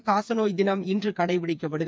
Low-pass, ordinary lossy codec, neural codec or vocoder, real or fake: none; none; codec, 16 kHz, 4 kbps, FreqCodec, smaller model; fake